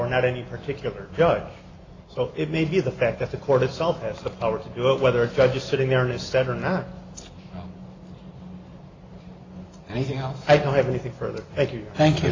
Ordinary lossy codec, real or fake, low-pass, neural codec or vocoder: AAC, 32 kbps; real; 7.2 kHz; none